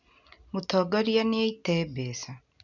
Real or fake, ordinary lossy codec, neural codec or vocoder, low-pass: real; none; none; 7.2 kHz